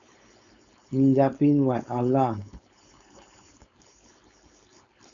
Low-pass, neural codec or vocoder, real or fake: 7.2 kHz; codec, 16 kHz, 4.8 kbps, FACodec; fake